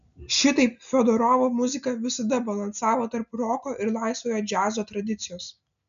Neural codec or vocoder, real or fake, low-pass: none; real; 7.2 kHz